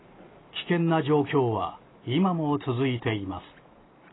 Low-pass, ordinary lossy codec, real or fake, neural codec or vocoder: 7.2 kHz; AAC, 16 kbps; real; none